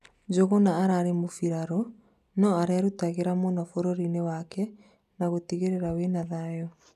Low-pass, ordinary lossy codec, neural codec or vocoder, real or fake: 14.4 kHz; none; none; real